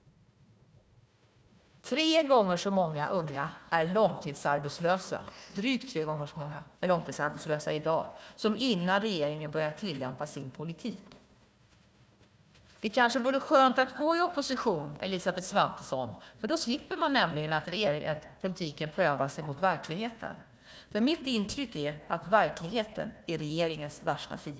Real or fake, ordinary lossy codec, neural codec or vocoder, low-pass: fake; none; codec, 16 kHz, 1 kbps, FunCodec, trained on Chinese and English, 50 frames a second; none